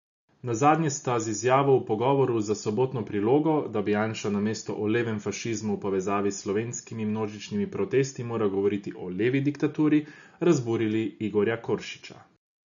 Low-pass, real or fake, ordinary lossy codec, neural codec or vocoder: 7.2 kHz; real; none; none